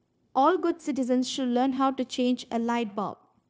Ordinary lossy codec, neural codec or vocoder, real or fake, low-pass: none; codec, 16 kHz, 0.9 kbps, LongCat-Audio-Codec; fake; none